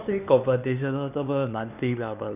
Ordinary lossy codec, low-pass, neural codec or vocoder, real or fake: none; 3.6 kHz; codec, 16 kHz, 2 kbps, X-Codec, HuBERT features, trained on LibriSpeech; fake